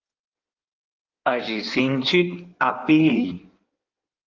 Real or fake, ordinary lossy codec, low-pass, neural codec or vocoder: fake; Opus, 24 kbps; 7.2 kHz; codec, 16 kHz in and 24 kHz out, 1.1 kbps, FireRedTTS-2 codec